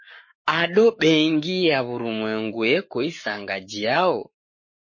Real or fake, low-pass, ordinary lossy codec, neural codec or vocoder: fake; 7.2 kHz; MP3, 32 kbps; codec, 44.1 kHz, 7.8 kbps, Pupu-Codec